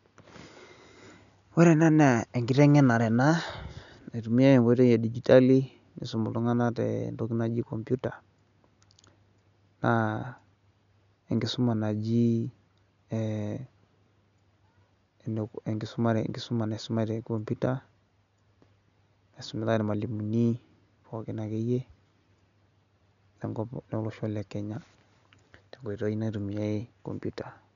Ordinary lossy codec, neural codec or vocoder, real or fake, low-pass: none; none; real; 7.2 kHz